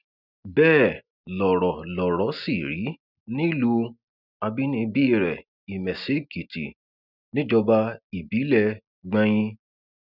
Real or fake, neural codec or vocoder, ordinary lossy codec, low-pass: real; none; none; 5.4 kHz